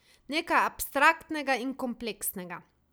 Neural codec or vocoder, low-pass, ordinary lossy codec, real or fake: none; none; none; real